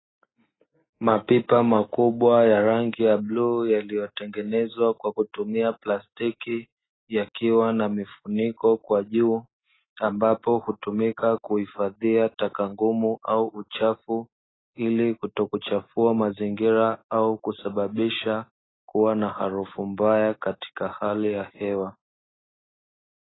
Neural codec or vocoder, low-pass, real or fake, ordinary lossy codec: none; 7.2 kHz; real; AAC, 16 kbps